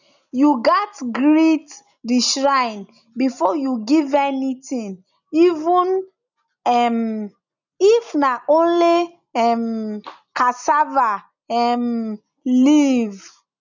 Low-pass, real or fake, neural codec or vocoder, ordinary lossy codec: 7.2 kHz; real; none; none